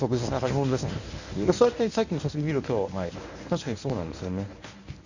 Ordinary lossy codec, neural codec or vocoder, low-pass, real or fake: none; codec, 24 kHz, 0.9 kbps, WavTokenizer, medium speech release version 1; 7.2 kHz; fake